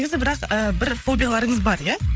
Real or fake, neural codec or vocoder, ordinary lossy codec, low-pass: fake; codec, 16 kHz, 8 kbps, FreqCodec, larger model; none; none